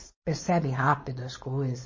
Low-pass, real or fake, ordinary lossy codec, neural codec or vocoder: 7.2 kHz; fake; MP3, 32 kbps; codec, 16 kHz, 4.8 kbps, FACodec